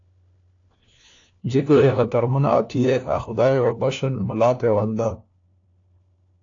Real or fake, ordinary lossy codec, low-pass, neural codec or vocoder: fake; AAC, 48 kbps; 7.2 kHz; codec, 16 kHz, 1 kbps, FunCodec, trained on LibriTTS, 50 frames a second